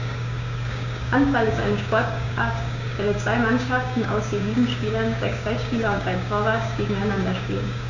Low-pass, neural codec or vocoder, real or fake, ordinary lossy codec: 7.2 kHz; codec, 16 kHz, 6 kbps, DAC; fake; none